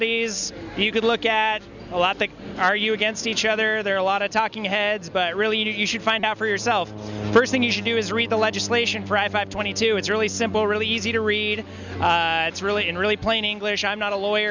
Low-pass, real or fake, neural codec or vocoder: 7.2 kHz; real; none